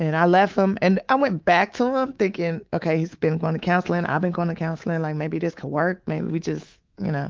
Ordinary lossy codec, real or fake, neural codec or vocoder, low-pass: Opus, 32 kbps; fake; codec, 24 kHz, 3.1 kbps, DualCodec; 7.2 kHz